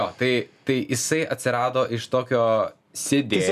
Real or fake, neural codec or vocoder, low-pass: real; none; 14.4 kHz